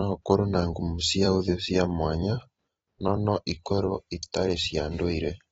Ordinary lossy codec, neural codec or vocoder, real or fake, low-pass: AAC, 24 kbps; vocoder, 44.1 kHz, 128 mel bands every 256 samples, BigVGAN v2; fake; 19.8 kHz